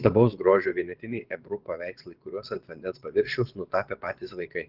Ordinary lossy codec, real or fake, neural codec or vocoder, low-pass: Opus, 16 kbps; fake; vocoder, 22.05 kHz, 80 mel bands, WaveNeXt; 5.4 kHz